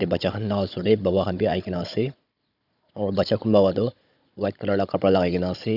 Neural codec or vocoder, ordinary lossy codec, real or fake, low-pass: codec, 16 kHz, 16 kbps, FunCodec, trained on Chinese and English, 50 frames a second; none; fake; 5.4 kHz